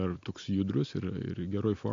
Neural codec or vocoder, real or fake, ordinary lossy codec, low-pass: none; real; AAC, 48 kbps; 7.2 kHz